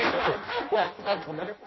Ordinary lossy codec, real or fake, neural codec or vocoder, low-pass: MP3, 24 kbps; fake; codec, 16 kHz in and 24 kHz out, 0.6 kbps, FireRedTTS-2 codec; 7.2 kHz